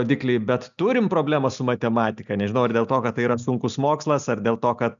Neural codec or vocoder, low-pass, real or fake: none; 7.2 kHz; real